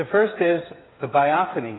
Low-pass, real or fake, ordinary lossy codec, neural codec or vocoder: 7.2 kHz; fake; AAC, 16 kbps; codec, 16 kHz, 8 kbps, FreqCodec, smaller model